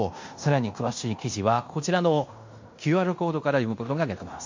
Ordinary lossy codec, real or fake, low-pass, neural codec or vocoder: MP3, 48 kbps; fake; 7.2 kHz; codec, 16 kHz in and 24 kHz out, 0.9 kbps, LongCat-Audio-Codec, fine tuned four codebook decoder